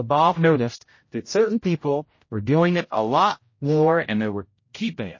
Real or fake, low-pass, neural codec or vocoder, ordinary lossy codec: fake; 7.2 kHz; codec, 16 kHz, 0.5 kbps, X-Codec, HuBERT features, trained on general audio; MP3, 32 kbps